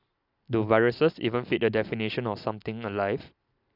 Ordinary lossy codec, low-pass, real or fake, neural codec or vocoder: none; 5.4 kHz; real; none